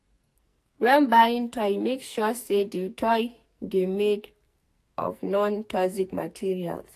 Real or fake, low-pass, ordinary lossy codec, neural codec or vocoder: fake; 14.4 kHz; AAC, 64 kbps; codec, 44.1 kHz, 2.6 kbps, SNAC